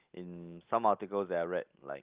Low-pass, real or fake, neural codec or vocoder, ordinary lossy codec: 3.6 kHz; real; none; Opus, 32 kbps